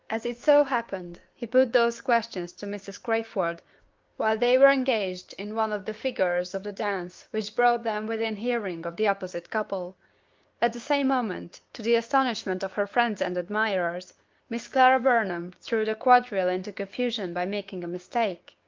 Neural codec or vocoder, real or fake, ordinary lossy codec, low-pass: codec, 16 kHz, 6 kbps, DAC; fake; Opus, 32 kbps; 7.2 kHz